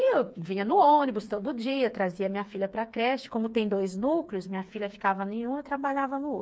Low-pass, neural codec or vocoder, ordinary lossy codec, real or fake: none; codec, 16 kHz, 4 kbps, FreqCodec, smaller model; none; fake